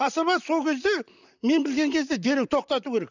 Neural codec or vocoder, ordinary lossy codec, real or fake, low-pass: vocoder, 44.1 kHz, 128 mel bands, Pupu-Vocoder; none; fake; 7.2 kHz